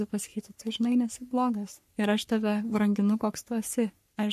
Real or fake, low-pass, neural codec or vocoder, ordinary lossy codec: fake; 14.4 kHz; codec, 44.1 kHz, 3.4 kbps, Pupu-Codec; MP3, 64 kbps